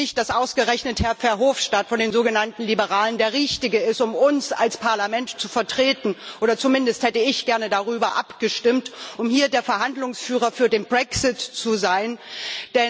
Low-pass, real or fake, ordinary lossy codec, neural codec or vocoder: none; real; none; none